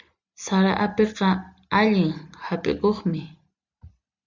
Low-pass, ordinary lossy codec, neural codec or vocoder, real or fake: 7.2 kHz; Opus, 64 kbps; none; real